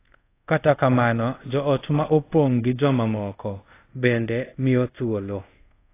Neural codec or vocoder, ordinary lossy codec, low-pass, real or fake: codec, 24 kHz, 0.5 kbps, DualCodec; AAC, 24 kbps; 3.6 kHz; fake